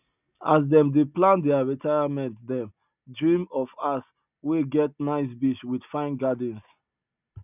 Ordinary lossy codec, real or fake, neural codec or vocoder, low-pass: none; real; none; 3.6 kHz